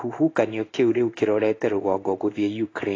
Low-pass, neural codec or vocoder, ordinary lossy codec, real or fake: 7.2 kHz; codec, 16 kHz in and 24 kHz out, 1 kbps, XY-Tokenizer; AAC, 32 kbps; fake